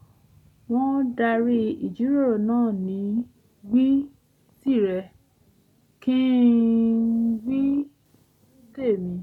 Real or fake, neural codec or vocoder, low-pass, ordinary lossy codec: real; none; 19.8 kHz; none